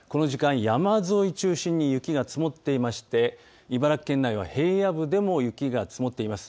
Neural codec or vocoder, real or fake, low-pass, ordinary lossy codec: none; real; none; none